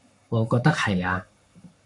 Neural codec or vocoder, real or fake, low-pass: codec, 44.1 kHz, 7.8 kbps, Pupu-Codec; fake; 10.8 kHz